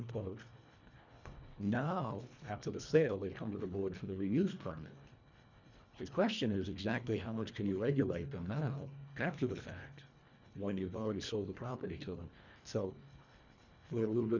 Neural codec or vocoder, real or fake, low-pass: codec, 24 kHz, 1.5 kbps, HILCodec; fake; 7.2 kHz